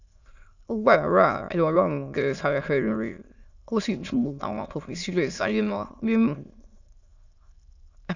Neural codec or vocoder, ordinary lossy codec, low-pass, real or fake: autoencoder, 22.05 kHz, a latent of 192 numbers a frame, VITS, trained on many speakers; none; 7.2 kHz; fake